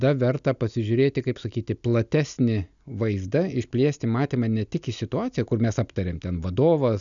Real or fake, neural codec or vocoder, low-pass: real; none; 7.2 kHz